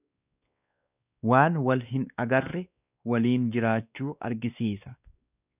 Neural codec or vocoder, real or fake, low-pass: codec, 16 kHz, 2 kbps, X-Codec, WavLM features, trained on Multilingual LibriSpeech; fake; 3.6 kHz